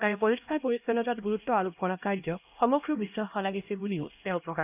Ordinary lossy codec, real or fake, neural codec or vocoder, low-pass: none; fake; codec, 16 kHz, 1 kbps, X-Codec, HuBERT features, trained on LibriSpeech; 3.6 kHz